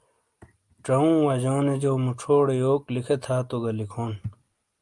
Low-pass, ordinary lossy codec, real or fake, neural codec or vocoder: 10.8 kHz; Opus, 32 kbps; real; none